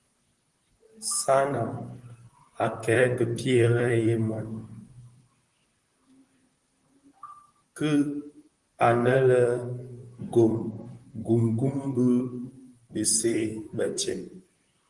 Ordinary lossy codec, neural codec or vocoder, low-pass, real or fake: Opus, 24 kbps; vocoder, 44.1 kHz, 128 mel bands, Pupu-Vocoder; 10.8 kHz; fake